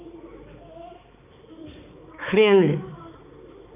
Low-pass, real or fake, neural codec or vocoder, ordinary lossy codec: 3.6 kHz; fake; codec, 16 kHz, 4 kbps, X-Codec, HuBERT features, trained on balanced general audio; none